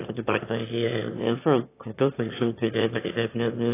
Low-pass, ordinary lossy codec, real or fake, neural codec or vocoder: 3.6 kHz; AAC, 24 kbps; fake; autoencoder, 22.05 kHz, a latent of 192 numbers a frame, VITS, trained on one speaker